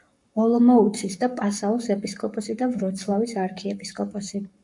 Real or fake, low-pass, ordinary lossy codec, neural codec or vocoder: fake; 10.8 kHz; MP3, 64 kbps; codec, 44.1 kHz, 7.8 kbps, Pupu-Codec